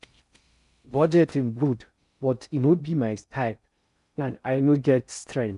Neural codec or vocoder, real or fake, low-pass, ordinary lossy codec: codec, 16 kHz in and 24 kHz out, 0.6 kbps, FocalCodec, streaming, 4096 codes; fake; 10.8 kHz; none